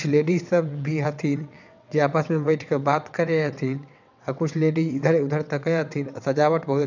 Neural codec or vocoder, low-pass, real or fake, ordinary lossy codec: vocoder, 22.05 kHz, 80 mel bands, WaveNeXt; 7.2 kHz; fake; none